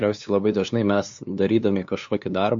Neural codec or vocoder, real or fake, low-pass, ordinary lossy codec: codec, 16 kHz, 2 kbps, FunCodec, trained on LibriTTS, 25 frames a second; fake; 7.2 kHz; MP3, 48 kbps